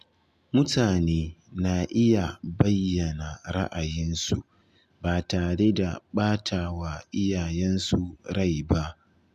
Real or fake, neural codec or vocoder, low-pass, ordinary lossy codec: real; none; 14.4 kHz; none